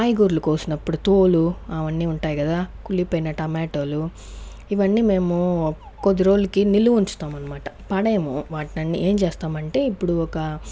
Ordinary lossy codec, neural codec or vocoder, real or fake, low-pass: none; none; real; none